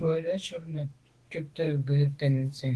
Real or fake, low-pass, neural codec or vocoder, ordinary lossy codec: fake; 10.8 kHz; autoencoder, 48 kHz, 32 numbers a frame, DAC-VAE, trained on Japanese speech; Opus, 16 kbps